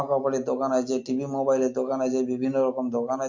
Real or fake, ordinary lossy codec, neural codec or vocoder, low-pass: real; MP3, 48 kbps; none; 7.2 kHz